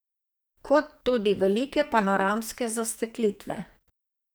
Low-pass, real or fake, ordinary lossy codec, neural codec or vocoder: none; fake; none; codec, 44.1 kHz, 2.6 kbps, SNAC